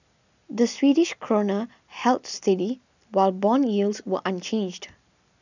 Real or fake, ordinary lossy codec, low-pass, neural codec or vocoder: real; none; 7.2 kHz; none